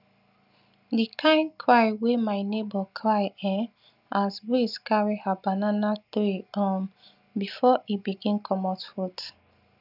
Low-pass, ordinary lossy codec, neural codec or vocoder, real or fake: 5.4 kHz; none; none; real